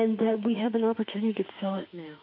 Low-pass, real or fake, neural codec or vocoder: 5.4 kHz; fake; autoencoder, 48 kHz, 32 numbers a frame, DAC-VAE, trained on Japanese speech